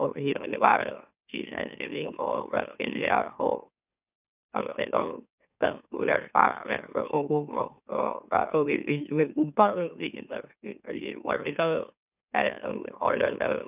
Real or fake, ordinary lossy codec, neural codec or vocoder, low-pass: fake; none; autoencoder, 44.1 kHz, a latent of 192 numbers a frame, MeloTTS; 3.6 kHz